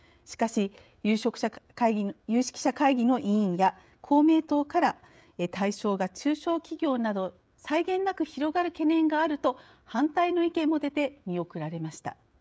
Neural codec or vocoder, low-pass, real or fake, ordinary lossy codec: codec, 16 kHz, 16 kbps, FreqCodec, smaller model; none; fake; none